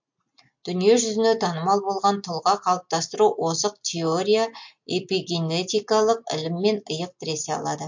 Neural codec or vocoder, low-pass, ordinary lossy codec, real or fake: none; 7.2 kHz; MP3, 48 kbps; real